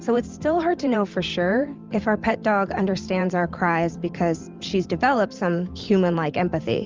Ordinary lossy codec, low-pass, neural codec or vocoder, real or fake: Opus, 24 kbps; 7.2 kHz; vocoder, 44.1 kHz, 128 mel bands every 512 samples, BigVGAN v2; fake